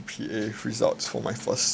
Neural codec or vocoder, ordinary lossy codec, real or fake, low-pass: none; none; real; none